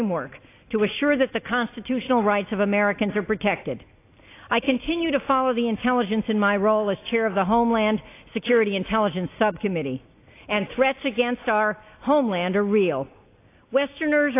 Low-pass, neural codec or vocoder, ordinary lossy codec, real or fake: 3.6 kHz; none; AAC, 24 kbps; real